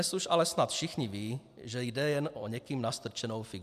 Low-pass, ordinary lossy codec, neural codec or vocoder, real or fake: 14.4 kHz; AAC, 96 kbps; none; real